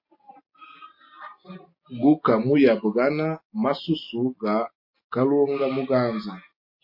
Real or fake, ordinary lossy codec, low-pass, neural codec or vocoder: real; MP3, 32 kbps; 5.4 kHz; none